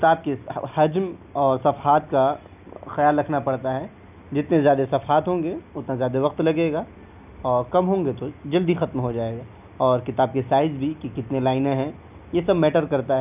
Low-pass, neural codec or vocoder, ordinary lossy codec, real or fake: 3.6 kHz; none; none; real